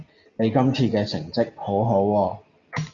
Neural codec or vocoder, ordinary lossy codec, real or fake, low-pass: none; Opus, 64 kbps; real; 7.2 kHz